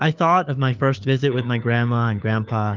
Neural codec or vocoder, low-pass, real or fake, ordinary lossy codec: codec, 16 kHz, 4 kbps, FunCodec, trained on LibriTTS, 50 frames a second; 7.2 kHz; fake; Opus, 24 kbps